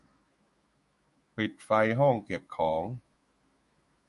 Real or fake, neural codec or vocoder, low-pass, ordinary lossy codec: fake; autoencoder, 48 kHz, 128 numbers a frame, DAC-VAE, trained on Japanese speech; 14.4 kHz; MP3, 48 kbps